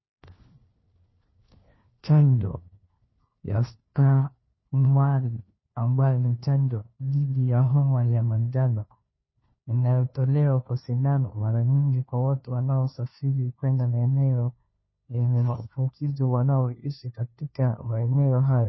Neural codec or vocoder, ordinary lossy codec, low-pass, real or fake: codec, 16 kHz, 1 kbps, FunCodec, trained on LibriTTS, 50 frames a second; MP3, 24 kbps; 7.2 kHz; fake